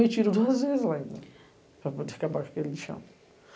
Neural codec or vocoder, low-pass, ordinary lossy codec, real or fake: none; none; none; real